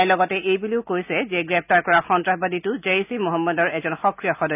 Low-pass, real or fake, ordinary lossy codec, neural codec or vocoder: 3.6 kHz; real; none; none